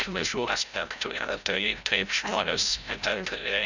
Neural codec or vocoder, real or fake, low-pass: codec, 16 kHz, 0.5 kbps, FreqCodec, larger model; fake; 7.2 kHz